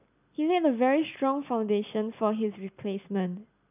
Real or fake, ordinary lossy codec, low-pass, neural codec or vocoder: real; none; 3.6 kHz; none